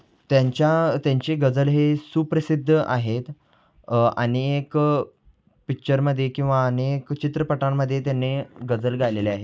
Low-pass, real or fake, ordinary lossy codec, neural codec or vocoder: none; real; none; none